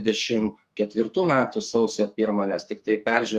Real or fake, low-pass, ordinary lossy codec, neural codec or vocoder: fake; 14.4 kHz; Opus, 64 kbps; codec, 44.1 kHz, 2.6 kbps, SNAC